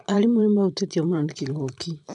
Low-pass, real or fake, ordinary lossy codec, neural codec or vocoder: 10.8 kHz; fake; none; vocoder, 44.1 kHz, 128 mel bands every 512 samples, BigVGAN v2